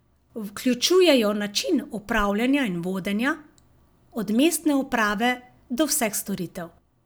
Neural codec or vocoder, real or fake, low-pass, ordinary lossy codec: none; real; none; none